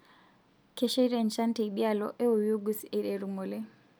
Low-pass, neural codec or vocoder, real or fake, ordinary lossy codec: none; none; real; none